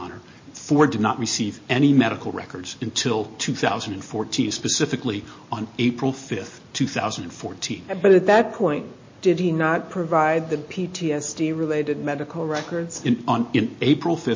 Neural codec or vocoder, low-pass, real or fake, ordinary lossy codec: none; 7.2 kHz; real; MP3, 32 kbps